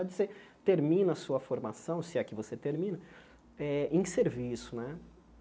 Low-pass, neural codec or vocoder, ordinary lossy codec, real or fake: none; none; none; real